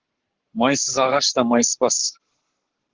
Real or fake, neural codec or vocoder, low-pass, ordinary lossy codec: fake; codec, 44.1 kHz, 7.8 kbps, Pupu-Codec; 7.2 kHz; Opus, 16 kbps